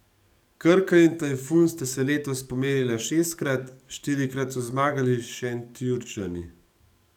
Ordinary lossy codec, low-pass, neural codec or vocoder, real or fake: none; 19.8 kHz; codec, 44.1 kHz, 7.8 kbps, DAC; fake